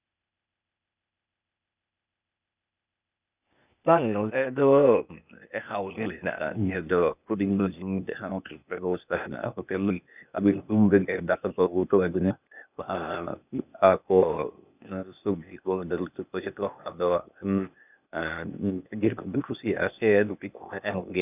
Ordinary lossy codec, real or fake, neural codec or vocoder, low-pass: none; fake; codec, 16 kHz, 0.8 kbps, ZipCodec; 3.6 kHz